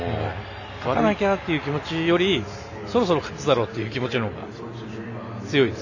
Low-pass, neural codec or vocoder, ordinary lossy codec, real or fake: 7.2 kHz; none; none; real